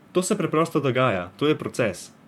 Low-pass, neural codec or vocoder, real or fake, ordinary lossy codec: 19.8 kHz; vocoder, 44.1 kHz, 128 mel bands, Pupu-Vocoder; fake; MP3, 96 kbps